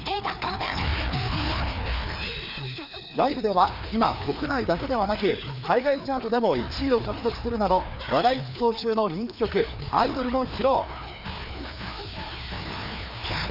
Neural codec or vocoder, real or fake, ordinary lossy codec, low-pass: codec, 16 kHz, 2 kbps, FreqCodec, larger model; fake; none; 5.4 kHz